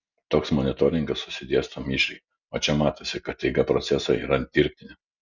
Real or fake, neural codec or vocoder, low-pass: real; none; 7.2 kHz